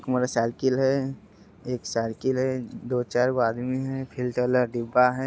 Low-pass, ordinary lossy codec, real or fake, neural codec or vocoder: none; none; real; none